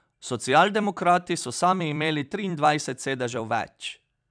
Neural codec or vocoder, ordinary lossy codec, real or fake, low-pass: vocoder, 44.1 kHz, 128 mel bands every 256 samples, BigVGAN v2; none; fake; 9.9 kHz